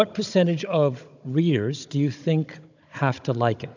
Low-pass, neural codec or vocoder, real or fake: 7.2 kHz; codec, 16 kHz, 16 kbps, FunCodec, trained on Chinese and English, 50 frames a second; fake